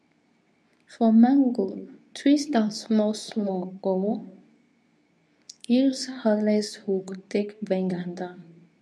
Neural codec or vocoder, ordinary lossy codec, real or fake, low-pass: codec, 24 kHz, 0.9 kbps, WavTokenizer, medium speech release version 2; none; fake; none